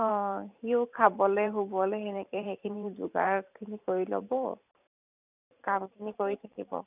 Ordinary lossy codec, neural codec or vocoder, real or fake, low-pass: none; vocoder, 44.1 kHz, 128 mel bands every 512 samples, BigVGAN v2; fake; 3.6 kHz